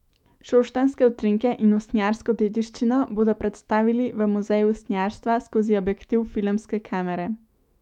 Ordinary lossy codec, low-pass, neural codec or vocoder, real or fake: MP3, 96 kbps; 19.8 kHz; autoencoder, 48 kHz, 128 numbers a frame, DAC-VAE, trained on Japanese speech; fake